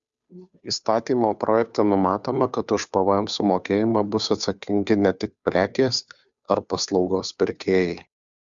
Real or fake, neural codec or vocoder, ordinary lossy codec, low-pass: fake; codec, 16 kHz, 2 kbps, FunCodec, trained on Chinese and English, 25 frames a second; Opus, 64 kbps; 7.2 kHz